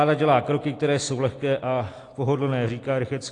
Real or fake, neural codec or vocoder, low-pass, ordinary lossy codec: real; none; 10.8 kHz; AAC, 48 kbps